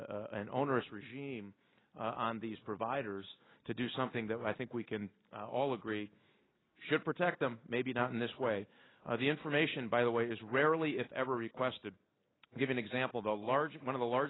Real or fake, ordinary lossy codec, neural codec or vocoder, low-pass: real; AAC, 16 kbps; none; 7.2 kHz